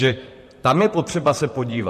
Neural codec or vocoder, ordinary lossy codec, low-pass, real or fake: vocoder, 44.1 kHz, 128 mel bands, Pupu-Vocoder; MP3, 64 kbps; 14.4 kHz; fake